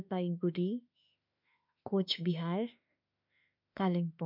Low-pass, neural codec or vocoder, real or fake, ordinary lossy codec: 5.4 kHz; autoencoder, 48 kHz, 32 numbers a frame, DAC-VAE, trained on Japanese speech; fake; MP3, 48 kbps